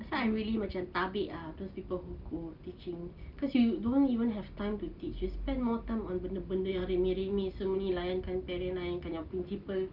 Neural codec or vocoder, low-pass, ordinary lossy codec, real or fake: none; 5.4 kHz; Opus, 32 kbps; real